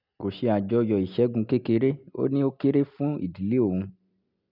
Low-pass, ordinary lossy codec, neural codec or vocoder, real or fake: 5.4 kHz; none; none; real